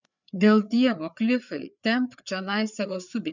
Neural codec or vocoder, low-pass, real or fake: codec, 16 kHz, 4 kbps, FreqCodec, larger model; 7.2 kHz; fake